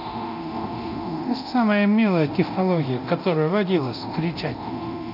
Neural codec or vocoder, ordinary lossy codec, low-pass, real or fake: codec, 24 kHz, 0.9 kbps, DualCodec; none; 5.4 kHz; fake